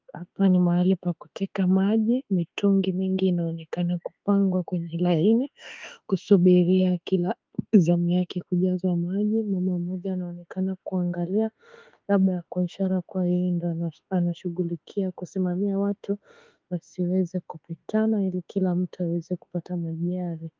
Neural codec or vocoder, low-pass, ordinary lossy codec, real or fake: autoencoder, 48 kHz, 32 numbers a frame, DAC-VAE, trained on Japanese speech; 7.2 kHz; Opus, 32 kbps; fake